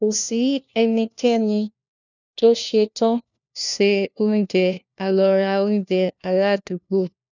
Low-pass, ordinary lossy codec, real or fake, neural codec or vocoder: 7.2 kHz; none; fake; codec, 16 kHz, 1 kbps, FunCodec, trained on LibriTTS, 50 frames a second